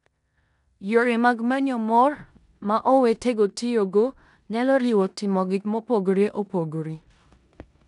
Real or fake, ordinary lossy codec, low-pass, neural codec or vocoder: fake; none; 10.8 kHz; codec, 16 kHz in and 24 kHz out, 0.9 kbps, LongCat-Audio-Codec, four codebook decoder